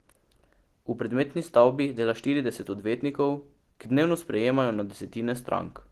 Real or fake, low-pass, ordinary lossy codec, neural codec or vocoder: real; 14.4 kHz; Opus, 16 kbps; none